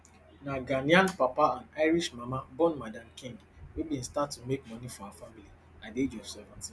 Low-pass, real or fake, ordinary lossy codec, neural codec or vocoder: none; real; none; none